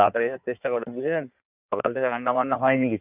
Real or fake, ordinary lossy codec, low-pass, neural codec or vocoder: fake; none; 3.6 kHz; codec, 16 kHz in and 24 kHz out, 1.1 kbps, FireRedTTS-2 codec